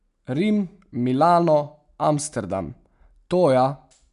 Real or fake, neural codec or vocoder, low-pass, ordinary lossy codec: real; none; 10.8 kHz; none